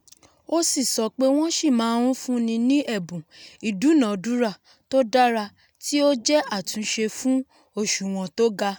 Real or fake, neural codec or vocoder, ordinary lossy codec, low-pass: real; none; none; none